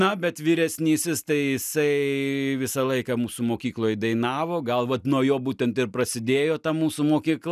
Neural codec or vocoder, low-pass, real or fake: none; 14.4 kHz; real